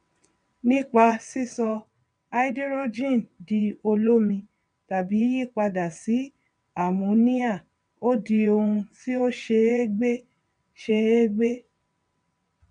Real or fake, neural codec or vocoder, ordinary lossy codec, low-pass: fake; vocoder, 22.05 kHz, 80 mel bands, WaveNeXt; none; 9.9 kHz